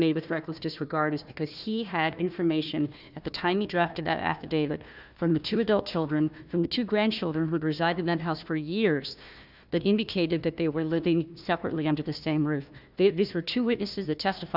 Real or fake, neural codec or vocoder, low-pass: fake; codec, 16 kHz, 1 kbps, FunCodec, trained on Chinese and English, 50 frames a second; 5.4 kHz